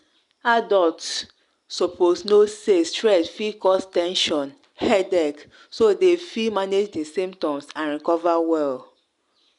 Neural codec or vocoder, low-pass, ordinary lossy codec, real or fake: none; 10.8 kHz; none; real